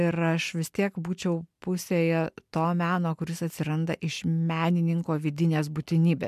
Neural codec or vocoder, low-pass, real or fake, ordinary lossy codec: none; 14.4 kHz; real; MP3, 96 kbps